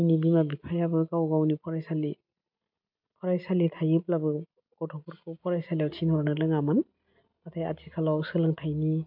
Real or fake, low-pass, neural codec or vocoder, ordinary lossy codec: real; 5.4 kHz; none; MP3, 48 kbps